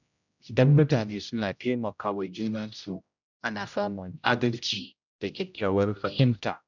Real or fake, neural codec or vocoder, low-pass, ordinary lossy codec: fake; codec, 16 kHz, 0.5 kbps, X-Codec, HuBERT features, trained on general audio; 7.2 kHz; none